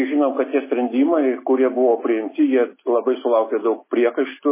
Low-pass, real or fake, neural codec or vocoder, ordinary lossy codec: 3.6 kHz; real; none; MP3, 16 kbps